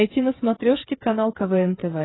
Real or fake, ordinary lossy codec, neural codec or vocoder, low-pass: fake; AAC, 16 kbps; codec, 16 kHz, 8 kbps, FreqCodec, smaller model; 7.2 kHz